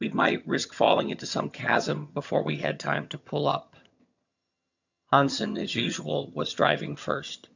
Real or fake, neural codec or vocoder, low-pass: fake; vocoder, 22.05 kHz, 80 mel bands, HiFi-GAN; 7.2 kHz